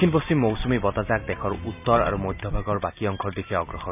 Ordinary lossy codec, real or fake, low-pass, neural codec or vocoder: none; real; 3.6 kHz; none